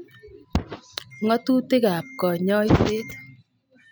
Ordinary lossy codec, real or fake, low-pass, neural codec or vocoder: none; real; none; none